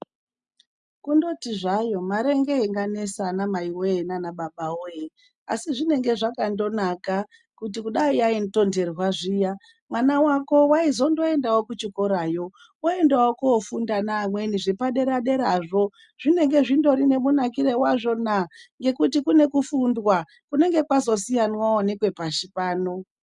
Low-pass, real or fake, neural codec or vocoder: 10.8 kHz; real; none